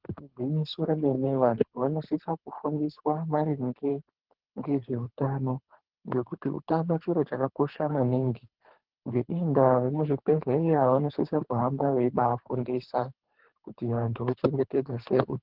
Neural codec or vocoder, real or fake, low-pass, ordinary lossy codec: codec, 24 kHz, 3 kbps, HILCodec; fake; 5.4 kHz; Opus, 16 kbps